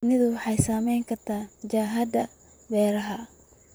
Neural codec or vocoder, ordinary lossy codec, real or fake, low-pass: vocoder, 44.1 kHz, 128 mel bands, Pupu-Vocoder; none; fake; none